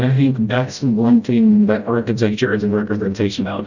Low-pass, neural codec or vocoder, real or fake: 7.2 kHz; codec, 16 kHz, 0.5 kbps, FreqCodec, smaller model; fake